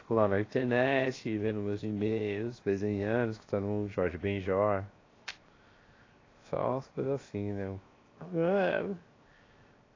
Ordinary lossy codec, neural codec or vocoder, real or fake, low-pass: AAC, 32 kbps; codec, 16 kHz, 0.7 kbps, FocalCodec; fake; 7.2 kHz